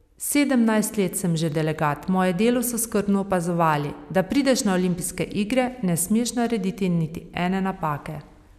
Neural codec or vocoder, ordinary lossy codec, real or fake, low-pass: none; none; real; 14.4 kHz